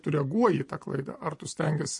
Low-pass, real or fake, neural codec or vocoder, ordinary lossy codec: 10.8 kHz; fake; vocoder, 24 kHz, 100 mel bands, Vocos; MP3, 48 kbps